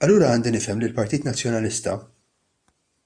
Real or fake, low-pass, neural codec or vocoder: fake; 9.9 kHz; vocoder, 24 kHz, 100 mel bands, Vocos